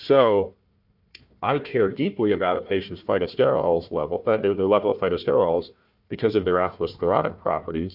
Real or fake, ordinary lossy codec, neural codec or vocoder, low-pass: fake; AAC, 48 kbps; codec, 16 kHz, 1 kbps, FunCodec, trained on Chinese and English, 50 frames a second; 5.4 kHz